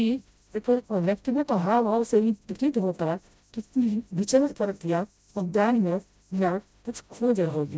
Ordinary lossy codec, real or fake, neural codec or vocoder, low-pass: none; fake; codec, 16 kHz, 0.5 kbps, FreqCodec, smaller model; none